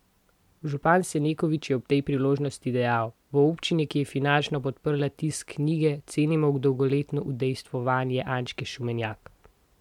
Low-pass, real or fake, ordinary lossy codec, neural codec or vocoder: 19.8 kHz; real; MP3, 96 kbps; none